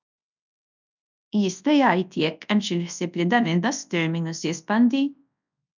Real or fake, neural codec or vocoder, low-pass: fake; codec, 24 kHz, 0.9 kbps, WavTokenizer, large speech release; 7.2 kHz